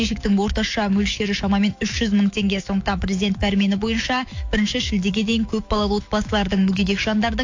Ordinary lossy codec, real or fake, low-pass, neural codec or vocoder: AAC, 48 kbps; fake; 7.2 kHz; autoencoder, 48 kHz, 128 numbers a frame, DAC-VAE, trained on Japanese speech